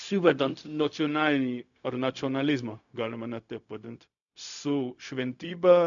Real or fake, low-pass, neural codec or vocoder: fake; 7.2 kHz; codec, 16 kHz, 0.4 kbps, LongCat-Audio-Codec